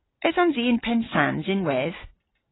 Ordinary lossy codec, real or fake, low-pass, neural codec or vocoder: AAC, 16 kbps; real; 7.2 kHz; none